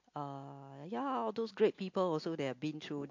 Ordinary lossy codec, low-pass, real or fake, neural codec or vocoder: MP3, 48 kbps; 7.2 kHz; real; none